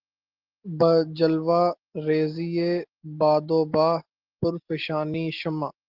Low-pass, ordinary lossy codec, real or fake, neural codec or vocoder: 5.4 kHz; Opus, 16 kbps; real; none